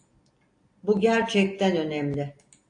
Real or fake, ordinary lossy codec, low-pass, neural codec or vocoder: real; AAC, 48 kbps; 9.9 kHz; none